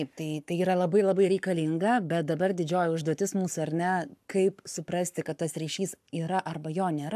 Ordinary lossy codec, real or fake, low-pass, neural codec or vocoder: AAC, 96 kbps; fake; 14.4 kHz; codec, 44.1 kHz, 7.8 kbps, Pupu-Codec